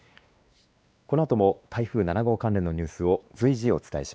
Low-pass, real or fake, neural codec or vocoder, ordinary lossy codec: none; fake; codec, 16 kHz, 2 kbps, X-Codec, WavLM features, trained on Multilingual LibriSpeech; none